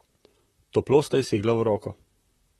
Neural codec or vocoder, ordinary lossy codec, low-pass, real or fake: vocoder, 44.1 kHz, 128 mel bands, Pupu-Vocoder; AAC, 32 kbps; 19.8 kHz; fake